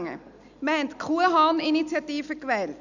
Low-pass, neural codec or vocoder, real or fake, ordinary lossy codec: 7.2 kHz; none; real; none